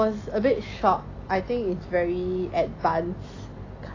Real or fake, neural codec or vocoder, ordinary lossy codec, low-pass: real; none; AAC, 32 kbps; 7.2 kHz